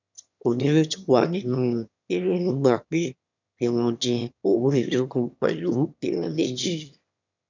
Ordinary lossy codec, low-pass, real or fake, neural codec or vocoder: none; 7.2 kHz; fake; autoencoder, 22.05 kHz, a latent of 192 numbers a frame, VITS, trained on one speaker